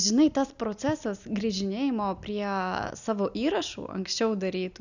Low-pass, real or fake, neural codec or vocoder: 7.2 kHz; real; none